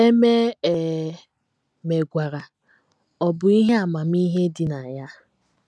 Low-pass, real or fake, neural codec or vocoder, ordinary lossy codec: none; real; none; none